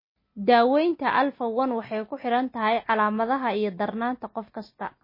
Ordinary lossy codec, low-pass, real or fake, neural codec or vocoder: MP3, 24 kbps; 5.4 kHz; real; none